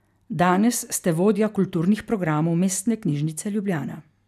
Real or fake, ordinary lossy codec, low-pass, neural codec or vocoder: real; none; 14.4 kHz; none